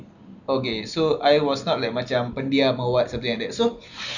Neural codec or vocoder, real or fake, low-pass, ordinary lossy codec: none; real; 7.2 kHz; none